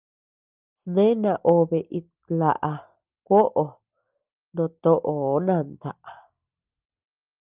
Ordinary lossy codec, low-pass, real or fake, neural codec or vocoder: Opus, 24 kbps; 3.6 kHz; real; none